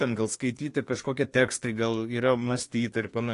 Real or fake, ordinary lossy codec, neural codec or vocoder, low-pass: fake; AAC, 48 kbps; codec, 24 kHz, 1 kbps, SNAC; 10.8 kHz